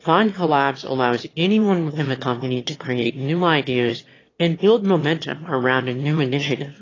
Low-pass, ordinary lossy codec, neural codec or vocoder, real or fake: 7.2 kHz; AAC, 32 kbps; autoencoder, 22.05 kHz, a latent of 192 numbers a frame, VITS, trained on one speaker; fake